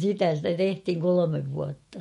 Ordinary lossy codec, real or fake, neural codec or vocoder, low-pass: MP3, 48 kbps; fake; autoencoder, 48 kHz, 128 numbers a frame, DAC-VAE, trained on Japanese speech; 19.8 kHz